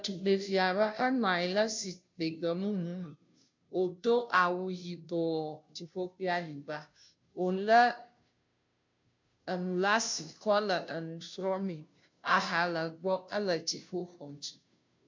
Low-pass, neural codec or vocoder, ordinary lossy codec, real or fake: 7.2 kHz; codec, 16 kHz, 0.5 kbps, FunCodec, trained on Chinese and English, 25 frames a second; MP3, 64 kbps; fake